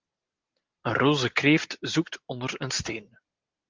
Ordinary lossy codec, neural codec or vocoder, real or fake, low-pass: Opus, 32 kbps; none; real; 7.2 kHz